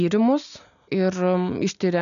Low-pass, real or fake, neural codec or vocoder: 7.2 kHz; real; none